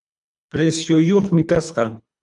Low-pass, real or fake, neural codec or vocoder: 10.8 kHz; fake; codec, 24 kHz, 3 kbps, HILCodec